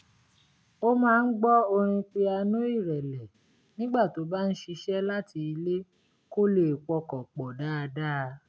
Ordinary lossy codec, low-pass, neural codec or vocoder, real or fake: none; none; none; real